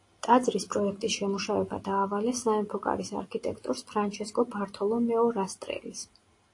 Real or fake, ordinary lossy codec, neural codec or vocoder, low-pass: real; AAC, 48 kbps; none; 10.8 kHz